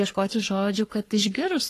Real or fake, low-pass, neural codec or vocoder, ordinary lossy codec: fake; 14.4 kHz; codec, 44.1 kHz, 3.4 kbps, Pupu-Codec; AAC, 48 kbps